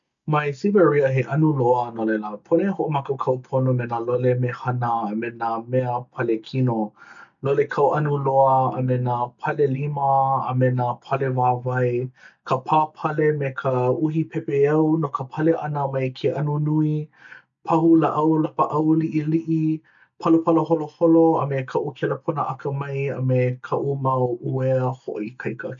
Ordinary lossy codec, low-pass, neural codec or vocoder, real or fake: none; 7.2 kHz; none; real